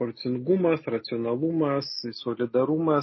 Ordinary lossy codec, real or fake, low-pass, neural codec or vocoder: MP3, 24 kbps; real; 7.2 kHz; none